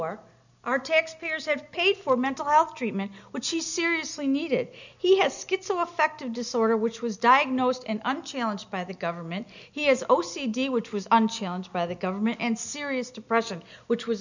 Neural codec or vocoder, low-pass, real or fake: none; 7.2 kHz; real